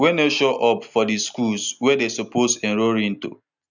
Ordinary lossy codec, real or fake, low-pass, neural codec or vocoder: none; real; 7.2 kHz; none